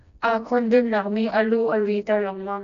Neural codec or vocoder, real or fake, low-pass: codec, 16 kHz, 1 kbps, FreqCodec, smaller model; fake; 7.2 kHz